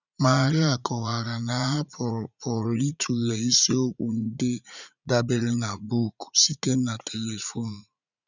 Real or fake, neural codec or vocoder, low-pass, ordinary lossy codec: fake; vocoder, 44.1 kHz, 80 mel bands, Vocos; 7.2 kHz; none